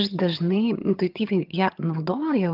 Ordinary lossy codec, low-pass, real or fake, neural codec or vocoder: Opus, 32 kbps; 5.4 kHz; fake; vocoder, 22.05 kHz, 80 mel bands, HiFi-GAN